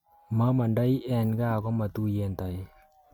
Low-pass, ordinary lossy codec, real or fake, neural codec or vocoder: 19.8 kHz; MP3, 96 kbps; real; none